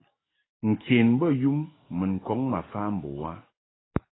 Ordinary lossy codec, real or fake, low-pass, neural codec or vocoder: AAC, 16 kbps; fake; 7.2 kHz; codec, 44.1 kHz, 7.8 kbps, DAC